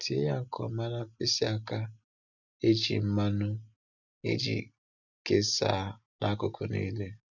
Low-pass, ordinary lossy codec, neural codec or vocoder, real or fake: 7.2 kHz; none; none; real